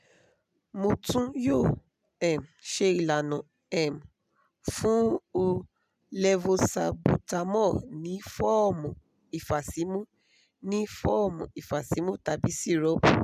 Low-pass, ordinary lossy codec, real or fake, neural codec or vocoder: 14.4 kHz; none; real; none